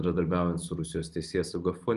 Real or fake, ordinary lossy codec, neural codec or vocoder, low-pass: real; Opus, 32 kbps; none; 10.8 kHz